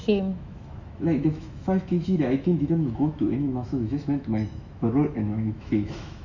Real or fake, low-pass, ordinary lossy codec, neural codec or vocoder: fake; 7.2 kHz; none; autoencoder, 48 kHz, 128 numbers a frame, DAC-VAE, trained on Japanese speech